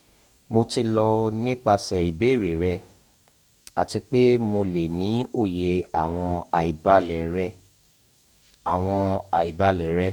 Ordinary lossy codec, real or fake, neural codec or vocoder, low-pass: none; fake; codec, 44.1 kHz, 2.6 kbps, DAC; 19.8 kHz